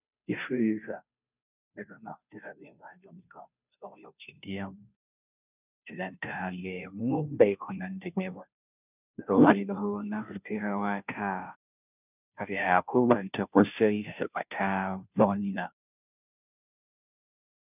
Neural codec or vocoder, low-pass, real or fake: codec, 16 kHz, 0.5 kbps, FunCodec, trained on Chinese and English, 25 frames a second; 3.6 kHz; fake